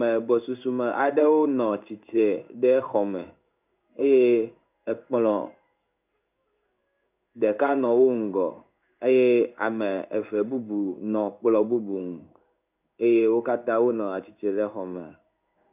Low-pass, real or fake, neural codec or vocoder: 3.6 kHz; fake; codec, 16 kHz in and 24 kHz out, 1 kbps, XY-Tokenizer